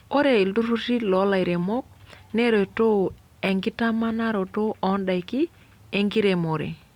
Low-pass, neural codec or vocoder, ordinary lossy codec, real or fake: 19.8 kHz; vocoder, 44.1 kHz, 128 mel bands every 256 samples, BigVGAN v2; Opus, 64 kbps; fake